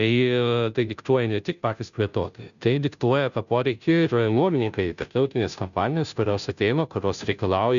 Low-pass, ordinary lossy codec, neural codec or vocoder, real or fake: 7.2 kHz; MP3, 96 kbps; codec, 16 kHz, 0.5 kbps, FunCodec, trained on Chinese and English, 25 frames a second; fake